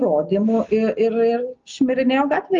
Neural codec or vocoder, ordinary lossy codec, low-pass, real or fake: none; Opus, 32 kbps; 7.2 kHz; real